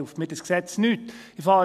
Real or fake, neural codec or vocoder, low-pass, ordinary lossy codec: real; none; 14.4 kHz; none